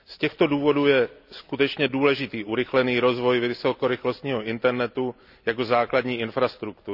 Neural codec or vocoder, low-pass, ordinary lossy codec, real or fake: none; 5.4 kHz; none; real